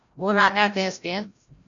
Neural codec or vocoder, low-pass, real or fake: codec, 16 kHz, 0.5 kbps, FreqCodec, larger model; 7.2 kHz; fake